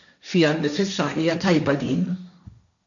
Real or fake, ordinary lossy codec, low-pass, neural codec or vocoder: fake; MP3, 96 kbps; 7.2 kHz; codec, 16 kHz, 1.1 kbps, Voila-Tokenizer